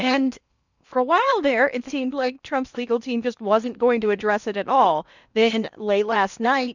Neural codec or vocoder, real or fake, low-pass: codec, 16 kHz in and 24 kHz out, 0.8 kbps, FocalCodec, streaming, 65536 codes; fake; 7.2 kHz